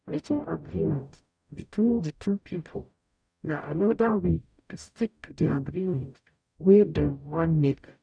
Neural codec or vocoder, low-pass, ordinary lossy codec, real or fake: codec, 44.1 kHz, 0.9 kbps, DAC; 9.9 kHz; none; fake